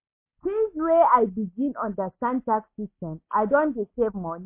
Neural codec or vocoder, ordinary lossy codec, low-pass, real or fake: none; MP3, 24 kbps; 3.6 kHz; real